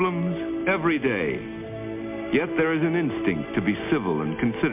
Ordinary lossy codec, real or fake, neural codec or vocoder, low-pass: MP3, 32 kbps; real; none; 3.6 kHz